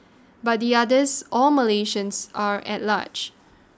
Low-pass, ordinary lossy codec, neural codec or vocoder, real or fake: none; none; none; real